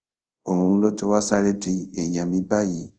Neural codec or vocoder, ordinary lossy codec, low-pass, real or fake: codec, 24 kHz, 0.5 kbps, DualCodec; Opus, 24 kbps; 9.9 kHz; fake